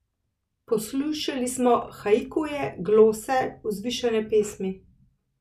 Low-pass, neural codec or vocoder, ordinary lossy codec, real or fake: 14.4 kHz; none; none; real